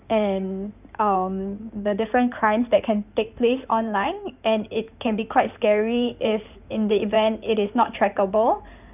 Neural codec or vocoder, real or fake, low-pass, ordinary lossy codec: codec, 16 kHz in and 24 kHz out, 2.2 kbps, FireRedTTS-2 codec; fake; 3.6 kHz; none